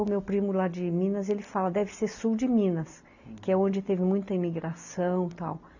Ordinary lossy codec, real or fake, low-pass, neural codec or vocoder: none; real; 7.2 kHz; none